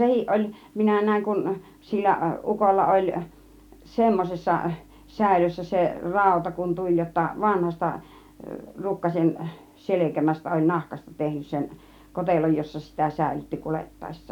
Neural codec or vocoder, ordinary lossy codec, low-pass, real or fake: none; none; 19.8 kHz; real